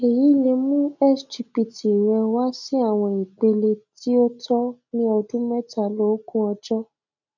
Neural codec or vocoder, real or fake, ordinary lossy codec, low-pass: none; real; none; 7.2 kHz